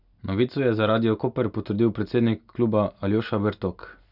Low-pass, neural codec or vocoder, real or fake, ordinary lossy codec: 5.4 kHz; none; real; none